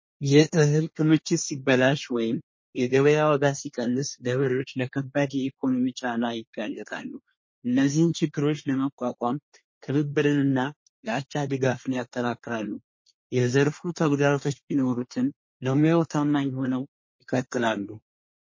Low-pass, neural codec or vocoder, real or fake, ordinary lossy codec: 7.2 kHz; codec, 24 kHz, 1 kbps, SNAC; fake; MP3, 32 kbps